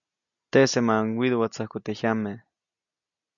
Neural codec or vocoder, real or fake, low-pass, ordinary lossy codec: none; real; 7.2 kHz; MP3, 96 kbps